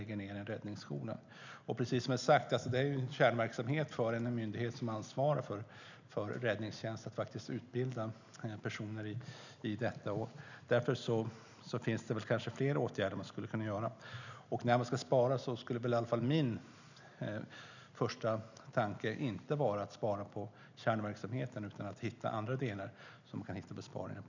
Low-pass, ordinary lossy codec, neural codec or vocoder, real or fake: 7.2 kHz; none; none; real